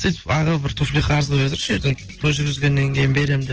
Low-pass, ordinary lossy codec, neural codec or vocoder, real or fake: 7.2 kHz; Opus, 16 kbps; none; real